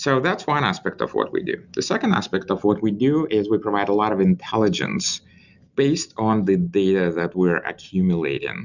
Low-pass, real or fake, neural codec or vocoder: 7.2 kHz; real; none